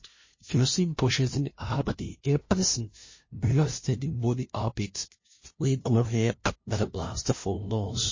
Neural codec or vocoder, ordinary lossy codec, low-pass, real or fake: codec, 16 kHz, 0.5 kbps, FunCodec, trained on LibriTTS, 25 frames a second; MP3, 32 kbps; 7.2 kHz; fake